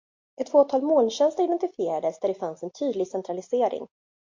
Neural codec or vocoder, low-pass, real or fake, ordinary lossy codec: none; 7.2 kHz; real; MP3, 48 kbps